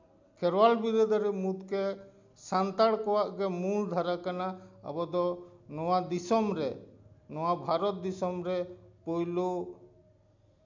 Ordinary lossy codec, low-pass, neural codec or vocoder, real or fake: MP3, 64 kbps; 7.2 kHz; none; real